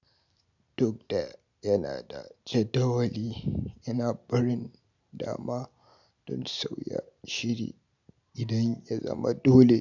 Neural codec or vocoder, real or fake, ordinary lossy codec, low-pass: none; real; none; 7.2 kHz